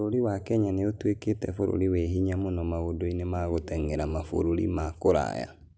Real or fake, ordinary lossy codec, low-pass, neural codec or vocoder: real; none; none; none